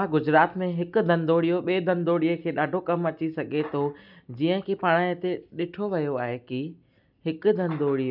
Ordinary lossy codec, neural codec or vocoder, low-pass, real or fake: none; none; 5.4 kHz; real